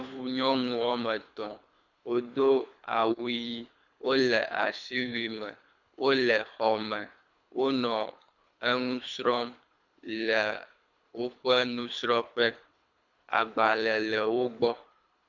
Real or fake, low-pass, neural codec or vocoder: fake; 7.2 kHz; codec, 24 kHz, 3 kbps, HILCodec